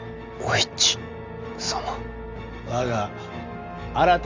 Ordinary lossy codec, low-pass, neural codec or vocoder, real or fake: Opus, 32 kbps; 7.2 kHz; none; real